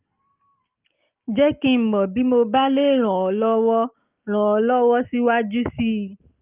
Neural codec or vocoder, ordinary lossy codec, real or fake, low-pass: none; Opus, 24 kbps; real; 3.6 kHz